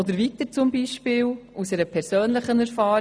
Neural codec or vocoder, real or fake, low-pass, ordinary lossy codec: none; real; none; none